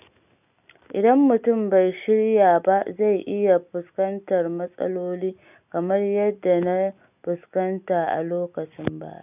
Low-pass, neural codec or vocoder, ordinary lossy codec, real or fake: 3.6 kHz; none; none; real